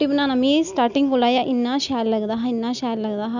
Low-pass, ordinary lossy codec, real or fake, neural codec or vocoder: 7.2 kHz; none; real; none